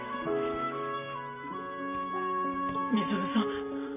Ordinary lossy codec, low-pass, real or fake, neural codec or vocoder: none; 3.6 kHz; fake; vocoder, 44.1 kHz, 128 mel bands every 512 samples, BigVGAN v2